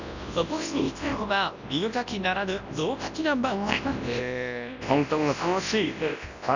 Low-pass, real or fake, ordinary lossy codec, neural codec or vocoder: 7.2 kHz; fake; none; codec, 24 kHz, 0.9 kbps, WavTokenizer, large speech release